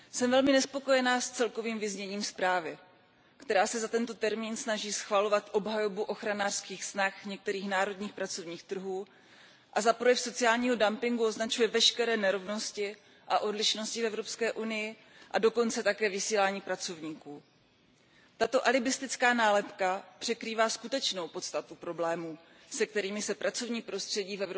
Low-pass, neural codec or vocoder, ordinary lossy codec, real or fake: none; none; none; real